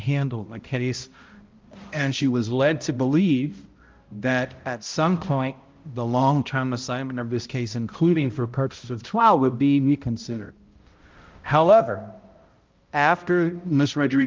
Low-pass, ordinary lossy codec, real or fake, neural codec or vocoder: 7.2 kHz; Opus, 32 kbps; fake; codec, 16 kHz, 0.5 kbps, X-Codec, HuBERT features, trained on balanced general audio